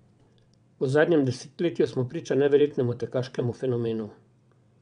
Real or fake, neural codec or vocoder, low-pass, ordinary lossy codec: fake; vocoder, 22.05 kHz, 80 mel bands, WaveNeXt; 9.9 kHz; none